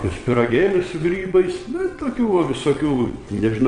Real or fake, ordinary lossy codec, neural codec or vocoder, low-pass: fake; MP3, 48 kbps; vocoder, 22.05 kHz, 80 mel bands, WaveNeXt; 9.9 kHz